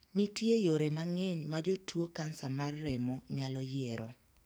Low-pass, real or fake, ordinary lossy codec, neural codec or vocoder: none; fake; none; codec, 44.1 kHz, 2.6 kbps, SNAC